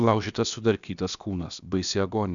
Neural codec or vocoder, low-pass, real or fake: codec, 16 kHz, about 1 kbps, DyCAST, with the encoder's durations; 7.2 kHz; fake